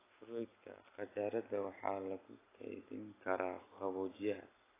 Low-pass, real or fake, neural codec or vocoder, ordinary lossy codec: 3.6 kHz; real; none; AAC, 16 kbps